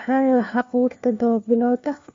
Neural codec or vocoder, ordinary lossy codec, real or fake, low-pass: codec, 16 kHz, 1 kbps, FunCodec, trained on LibriTTS, 50 frames a second; MP3, 48 kbps; fake; 7.2 kHz